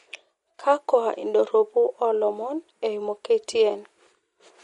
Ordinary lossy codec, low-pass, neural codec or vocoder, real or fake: MP3, 48 kbps; 19.8 kHz; none; real